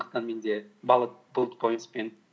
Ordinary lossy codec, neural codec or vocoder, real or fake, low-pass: none; none; real; none